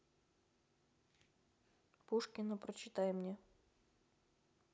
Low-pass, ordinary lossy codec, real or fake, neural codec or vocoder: none; none; real; none